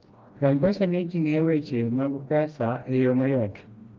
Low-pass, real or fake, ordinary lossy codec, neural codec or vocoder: 7.2 kHz; fake; Opus, 24 kbps; codec, 16 kHz, 1 kbps, FreqCodec, smaller model